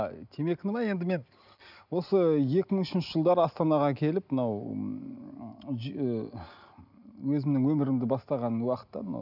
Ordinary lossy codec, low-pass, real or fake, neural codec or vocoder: none; 5.4 kHz; real; none